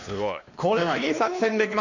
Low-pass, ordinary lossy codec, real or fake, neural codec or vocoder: 7.2 kHz; none; fake; codec, 16 kHz, 2 kbps, X-Codec, WavLM features, trained on Multilingual LibriSpeech